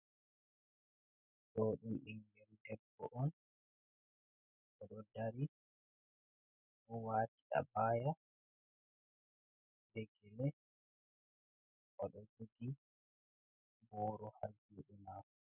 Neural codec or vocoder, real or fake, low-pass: none; real; 3.6 kHz